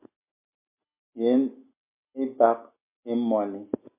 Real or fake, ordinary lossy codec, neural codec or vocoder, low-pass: real; MP3, 24 kbps; none; 3.6 kHz